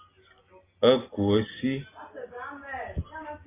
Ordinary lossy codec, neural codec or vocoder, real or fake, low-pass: AAC, 16 kbps; none; real; 3.6 kHz